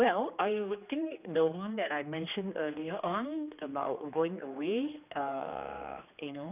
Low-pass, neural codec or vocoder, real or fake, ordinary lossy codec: 3.6 kHz; codec, 16 kHz, 2 kbps, X-Codec, HuBERT features, trained on general audio; fake; none